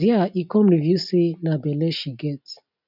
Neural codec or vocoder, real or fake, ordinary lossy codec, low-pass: none; real; none; 5.4 kHz